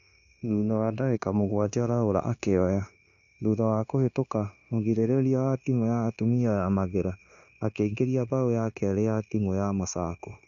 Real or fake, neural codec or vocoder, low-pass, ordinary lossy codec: fake; codec, 16 kHz, 0.9 kbps, LongCat-Audio-Codec; 7.2 kHz; none